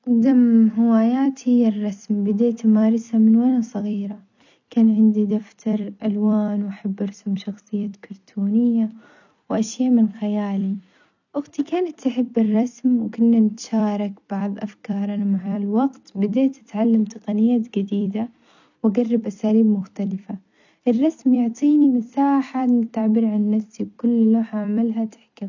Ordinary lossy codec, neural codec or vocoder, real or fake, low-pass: none; vocoder, 44.1 kHz, 128 mel bands every 256 samples, BigVGAN v2; fake; 7.2 kHz